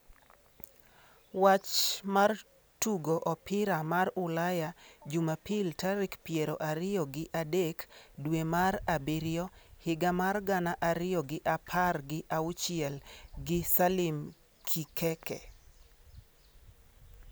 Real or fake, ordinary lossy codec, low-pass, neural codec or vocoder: real; none; none; none